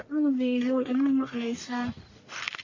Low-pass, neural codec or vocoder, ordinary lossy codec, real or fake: 7.2 kHz; codec, 44.1 kHz, 1.7 kbps, Pupu-Codec; MP3, 32 kbps; fake